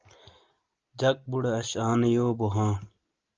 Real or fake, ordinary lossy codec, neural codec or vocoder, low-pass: real; Opus, 24 kbps; none; 7.2 kHz